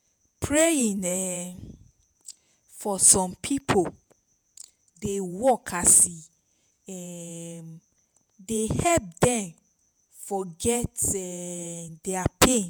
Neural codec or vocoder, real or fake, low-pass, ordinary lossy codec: vocoder, 48 kHz, 128 mel bands, Vocos; fake; none; none